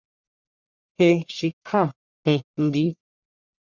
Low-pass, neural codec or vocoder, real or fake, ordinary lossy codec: 7.2 kHz; codec, 44.1 kHz, 1.7 kbps, Pupu-Codec; fake; Opus, 64 kbps